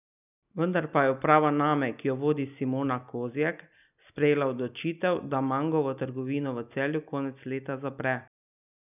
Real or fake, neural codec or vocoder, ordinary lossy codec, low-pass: real; none; none; 3.6 kHz